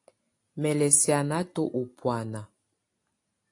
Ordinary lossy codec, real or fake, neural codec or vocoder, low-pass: AAC, 48 kbps; real; none; 10.8 kHz